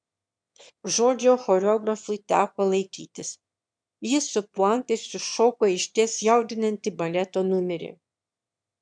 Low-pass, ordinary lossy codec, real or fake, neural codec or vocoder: 9.9 kHz; MP3, 96 kbps; fake; autoencoder, 22.05 kHz, a latent of 192 numbers a frame, VITS, trained on one speaker